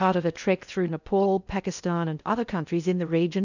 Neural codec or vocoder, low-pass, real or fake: codec, 16 kHz in and 24 kHz out, 0.6 kbps, FocalCodec, streaming, 2048 codes; 7.2 kHz; fake